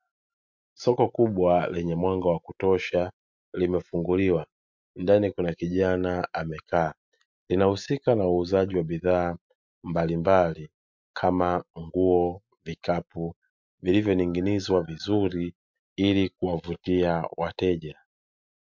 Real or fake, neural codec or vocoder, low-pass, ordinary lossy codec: real; none; 7.2 kHz; MP3, 64 kbps